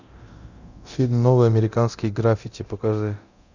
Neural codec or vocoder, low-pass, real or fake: codec, 24 kHz, 0.9 kbps, DualCodec; 7.2 kHz; fake